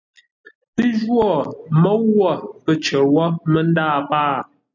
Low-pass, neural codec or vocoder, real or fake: 7.2 kHz; none; real